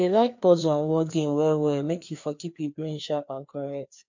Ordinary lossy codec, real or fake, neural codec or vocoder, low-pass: MP3, 48 kbps; fake; codec, 16 kHz, 2 kbps, FreqCodec, larger model; 7.2 kHz